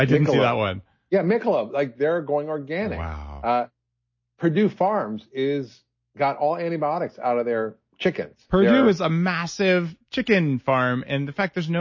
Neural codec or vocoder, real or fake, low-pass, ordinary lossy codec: none; real; 7.2 kHz; MP3, 32 kbps